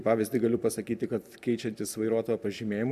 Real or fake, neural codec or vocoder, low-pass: real; none; 14.4 kHz